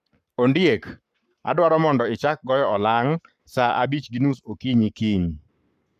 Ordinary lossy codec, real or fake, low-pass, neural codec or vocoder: none; fake; 14.4 kHz; codec, 44.1 kHz, 7.8 kbps, Pupu-Codec